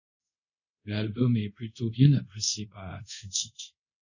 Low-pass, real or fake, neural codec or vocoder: 7.2 kHz; fake; codec, 24 kHz, 0.5 kbps, DualCodec